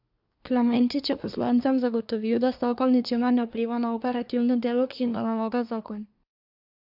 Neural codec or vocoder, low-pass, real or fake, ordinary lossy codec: codec, 24 kHz, 1 kbps, SNAC; 5.4 kHz; fake; AAC, 48 kbps